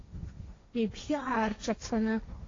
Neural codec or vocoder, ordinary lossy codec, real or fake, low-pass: codec, 16 kHz, 1.1 kbps, Voila-Tokenizer; AAC, 32 kbps; fake; 7.2 kHz